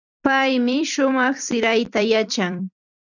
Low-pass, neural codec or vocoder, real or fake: 7.2 kHz; vocoder, 44.1 kHz, 128 mel bands every 256 samples, BigVGAN v2; fake